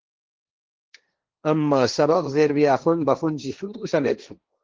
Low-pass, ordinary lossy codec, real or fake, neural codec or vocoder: 7.2 kHz; Opus, 16 kbps; fake; codec, 16 kHz, 1.1 kbps, Voila-Tokenizer